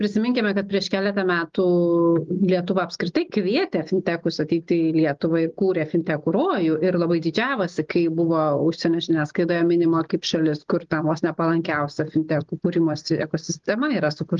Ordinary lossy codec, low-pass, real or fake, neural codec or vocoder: Opus, 32 kbps; 7.2 kHz; real; none